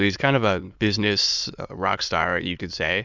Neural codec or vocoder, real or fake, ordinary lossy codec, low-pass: autoencoder, 22.05 kHz, a latent of 192 numbers a frame, VITS, trained on many speakers; fake; Opus, 64 kbps; 7.2 kHz